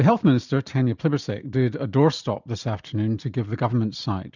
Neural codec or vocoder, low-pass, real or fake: vocoder, 44.1 kHz, 128 mel bands every 256 samples, BigVGAN v2; 7.2 kHz; fake